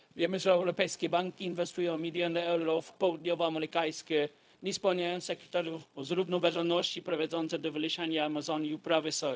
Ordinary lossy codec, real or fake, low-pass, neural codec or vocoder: none; fake; none; codec, 16 kHz, 0.4 kbps, LongCat-Audio-Codec